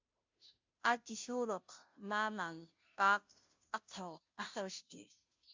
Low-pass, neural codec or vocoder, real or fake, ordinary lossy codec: 7.2 kHz; codec, 16 kHz, 0.5 kbps, FunCodec, trained on Chinese and English, 25 frames a second; fake; MP3, 64 kbps